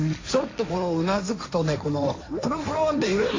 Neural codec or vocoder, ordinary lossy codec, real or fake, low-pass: codec, 16 kHz, 1.1 kbps, Voila-Tokenizer; AAC, 32 kbps; fake; 7.2 kHz